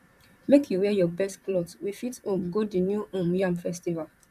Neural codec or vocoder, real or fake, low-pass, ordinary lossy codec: vocoder, 44.1 kHz, 128 mel bands, Pupu-Vocoder; fake; 14.4 kHz; none